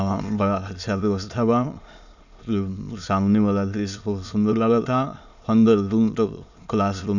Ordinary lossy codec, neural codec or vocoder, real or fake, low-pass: none; autoencoder, 22.05 kHz, a latent of 192 numbers a frame, VITS, trained on many speakers; fake; 7.2 kHz